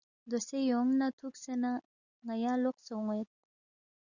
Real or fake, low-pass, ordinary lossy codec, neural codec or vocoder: real; 7.2 kHz; Opus, 64 kbps; none